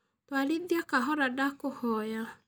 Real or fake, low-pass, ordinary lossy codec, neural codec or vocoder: real; none; none; none